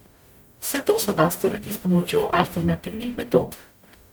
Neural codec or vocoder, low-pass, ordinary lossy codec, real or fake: codec, 44.1 kHz, 0.9 kbps, DAC; none; none; fake